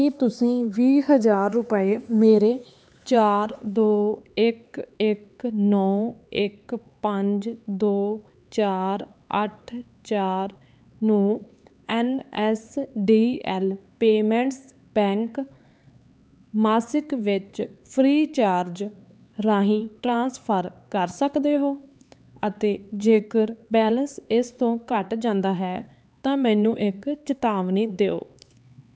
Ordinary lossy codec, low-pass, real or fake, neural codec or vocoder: none; none; fake; codec, 16 kHz, 4 kbps, X-Codec, HuBERT features, trained on LibriSpeech